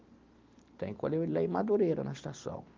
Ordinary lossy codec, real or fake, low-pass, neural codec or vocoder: Opus, 24 kbps; real; 7.2 kHz; none